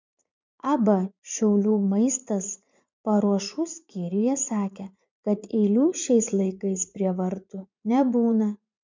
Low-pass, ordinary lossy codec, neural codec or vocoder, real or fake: 7.2 kHz; MP3, 64 kbps; vocoder, 44.1 kHz, 80 mel bands, Vocos; fake